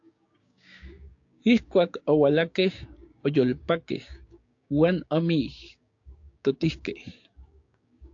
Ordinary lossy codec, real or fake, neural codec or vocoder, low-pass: AAC, 48 kbps; fake; codec, 16 kHz, 6 kbps, DAC; 7.2 kHz